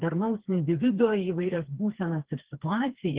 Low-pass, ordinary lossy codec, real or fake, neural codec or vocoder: 3.6 kHz; Opus, 16 kbps; fake; codec, 16 kHz, 4 kbps, FreqCodec, smaller model